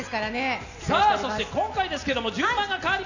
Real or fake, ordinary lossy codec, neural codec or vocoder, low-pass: real; none; none; 7.2 kHz